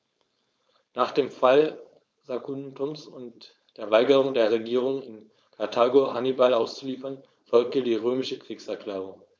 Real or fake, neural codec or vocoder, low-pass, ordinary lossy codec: fake; codec, 16 kHz, 4.8 kbps, FACodec; none; none